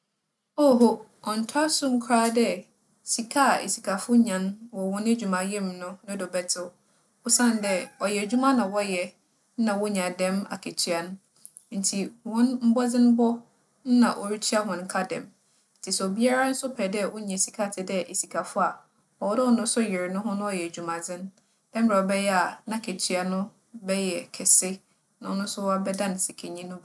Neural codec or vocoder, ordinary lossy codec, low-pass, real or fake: none; none; none; real